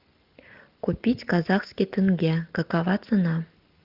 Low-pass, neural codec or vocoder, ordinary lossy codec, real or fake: 5.4 kHz; none; Opus, 32 kbps; real